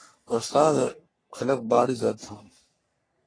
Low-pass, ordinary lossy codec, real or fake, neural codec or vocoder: 9.9 kHz; AAC, 32 kbps; fake; codec, 44.1 kHz, 3.4 kbps, Pupu-Codec